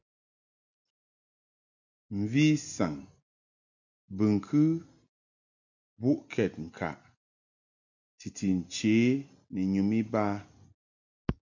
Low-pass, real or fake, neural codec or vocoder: 7.2 kHz; real; none